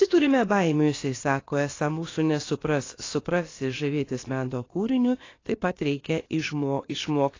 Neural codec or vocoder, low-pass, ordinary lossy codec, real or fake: codec, 16 kHz, about 1 kbps, DyCAST, with the encoder's durations; 7.2 kHz; AAC, 32 kbps; fake